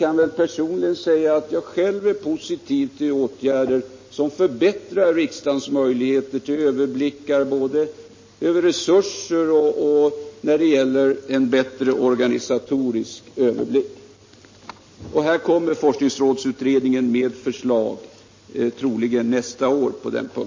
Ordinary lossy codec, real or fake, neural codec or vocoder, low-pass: MP3, 32 kbps; real; none; 7.2 kHz